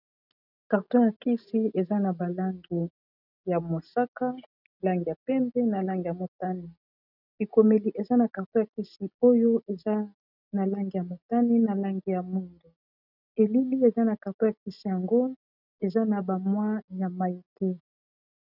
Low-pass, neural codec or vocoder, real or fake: 5.4 kHz; none; real